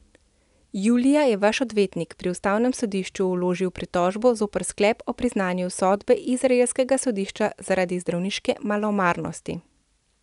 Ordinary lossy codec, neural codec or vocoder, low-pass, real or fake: none; none; 10.8 kHz; real